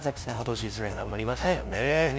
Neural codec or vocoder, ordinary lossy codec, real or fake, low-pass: codec, 16 kHz, 0.5 kbps, FunCodec, trained on LibriTTS, 25 frames a second; none; fake; none